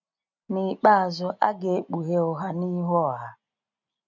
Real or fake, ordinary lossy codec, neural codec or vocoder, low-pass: real; none; none; 7.2 kHz